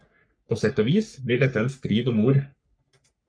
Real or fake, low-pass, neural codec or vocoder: fake; 9.9 kHz; codec, 44.1 kHz, 3.4 kbps, Pupu-Codec